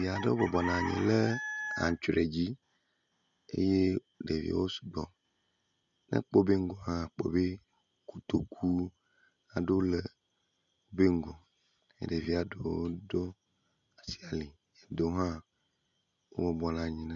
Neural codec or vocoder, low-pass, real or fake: none; 7.2 kHz; real